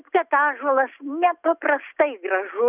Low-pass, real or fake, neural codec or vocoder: 3.6 kHz; real; none